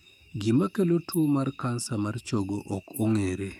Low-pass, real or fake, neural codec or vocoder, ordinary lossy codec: 19.8 kHz; fake; codec, 44.1 kHz, 7.8 kbps, DAC; none